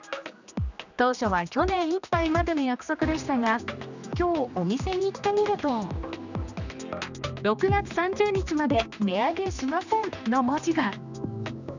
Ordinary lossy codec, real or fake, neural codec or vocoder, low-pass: none; fake; codec, 16 kHz, 2 kbps, X-Codec, HuBERT features, trained on general audio; 7.2 kHz